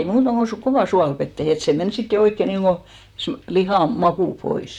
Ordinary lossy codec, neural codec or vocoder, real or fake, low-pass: none; vocoder, 44.1 kHz, 128 mel bands, Pupu-Vocoder; fake; 19.8 kHz